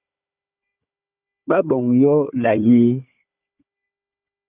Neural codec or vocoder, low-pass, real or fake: codec, 16 kHz, 4 kbps, FunCodec, trained on Chinese and English, 50 frames a second; 3.6 kHz; fake